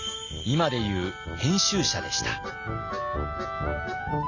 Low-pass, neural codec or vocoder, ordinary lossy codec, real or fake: 7.2 kHz; none; none; real